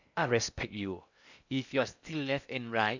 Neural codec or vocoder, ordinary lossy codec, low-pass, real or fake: codec, 16 kHz in and 24 kHz out, 0.8 kbps, FocalCodec, streaming, 65536 codes; none; 7.2 kHz; fake